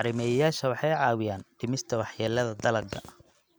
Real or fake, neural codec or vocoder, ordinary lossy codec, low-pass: real; none; none; none